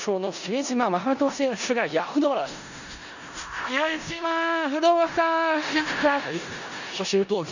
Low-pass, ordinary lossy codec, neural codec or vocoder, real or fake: 7.2 kHz; none; codec, 16 kHz in and 24 kHz out, 0.4 kbps, LongCat-Audio-Codec, four codebook decoder; fake